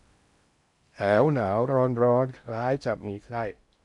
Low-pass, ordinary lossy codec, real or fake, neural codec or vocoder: 10.8 kHz; none; fake; codec, 16 kHz in and 24 kHz out, 0.6 kbps, FocalCodec, streaming, 2048 codes